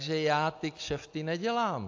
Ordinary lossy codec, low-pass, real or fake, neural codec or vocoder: AAC, 48 kbps; 7.2 kHz; real; none